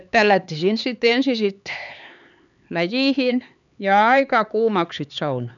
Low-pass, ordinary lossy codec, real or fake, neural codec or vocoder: 7.2 kHz; none; fake; codec, 16 kHz, 4 kbps, X-Codec, HuBERT features, trained on LibriSpeech